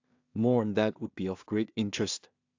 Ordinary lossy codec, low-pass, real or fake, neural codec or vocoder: AAC, 48 kbps; 7.2 kHz; fake; codec, 16 kHz in and 24 kHz out, 0.4 kbps, LongCat-Audio-Codec, two codebook decoder